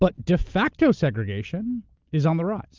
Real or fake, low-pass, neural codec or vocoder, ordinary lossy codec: real; 7.2 kHz; none; Opus, 32 kbps